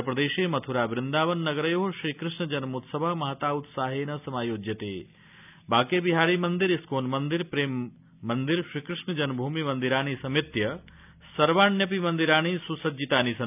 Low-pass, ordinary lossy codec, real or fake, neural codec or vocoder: 3.6 kHz; none; real; none